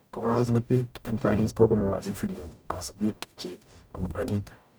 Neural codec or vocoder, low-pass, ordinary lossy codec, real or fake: codec, 44.1 kHz, 0.9 kbps, DAC; none; none; fake